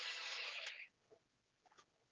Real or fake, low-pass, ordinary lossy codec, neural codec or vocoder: fake; 7.2 kHz; Opus, 16 kbps; codec, 16 kHz, 4 kbps, X-Codec, HuBERT features, trained on general audio